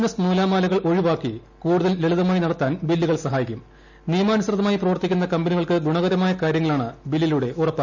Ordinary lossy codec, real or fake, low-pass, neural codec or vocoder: none; real; 7.2 kHz; none